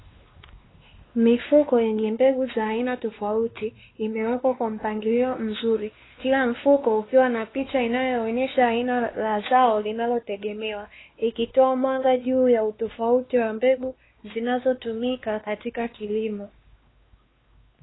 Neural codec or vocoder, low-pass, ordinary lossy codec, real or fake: codec, 16 kHz, 2 kbps, X-Codec, WavLM features, trained on Multilingual LibriSpeech; 7.2 kHz; AAC, 16 kbps; fake